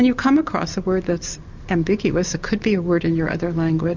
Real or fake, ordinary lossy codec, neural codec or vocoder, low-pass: real; MP3, 64 kbps; none; 7.2 kHz